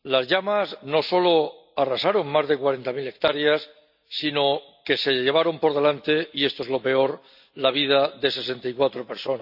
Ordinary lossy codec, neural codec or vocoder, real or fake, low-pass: none; none; real; 5.4 kHz